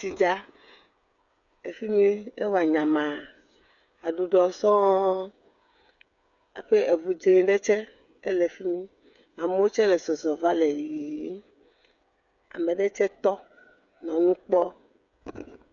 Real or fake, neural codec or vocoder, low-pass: fake; codec, 16 kHz, 8 kbps, FreqCodec, smaller model; 7.2 kHz